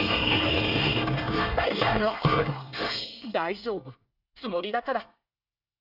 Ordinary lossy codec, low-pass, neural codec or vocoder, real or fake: none; 5.4 kHz; codec, 24 kHz, 1 kbps, SNAC; fake